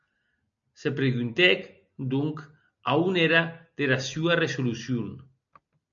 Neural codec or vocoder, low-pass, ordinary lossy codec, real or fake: none; 7.2 kHz; MP3, 48 kbps; real